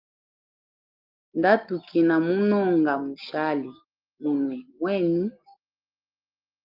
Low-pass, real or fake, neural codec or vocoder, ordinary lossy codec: 5.4 kHz; real; none; Opus, 24 kbps